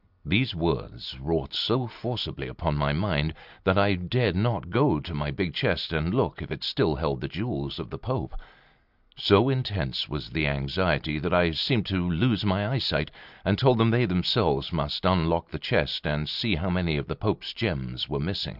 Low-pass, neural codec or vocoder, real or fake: 5.4 kHz; none; real